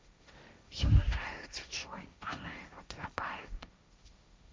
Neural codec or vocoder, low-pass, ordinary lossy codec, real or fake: codec, 16 kHz, 1.1 kbps, Voila-Tokenizer; none; none; fake